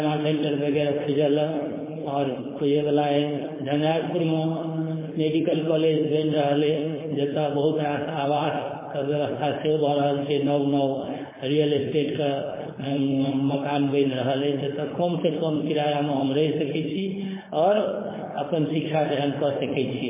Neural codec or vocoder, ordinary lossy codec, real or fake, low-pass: codec, 16 kHz, 4.8 kbps, FACodec; MP3, 16 kbps; fake; 3.6 kHz